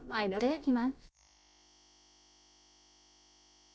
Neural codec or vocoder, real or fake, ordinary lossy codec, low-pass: codec, 16 kHz, about 1 kbps, DyCAST, with the encoder's durations; fake; none; none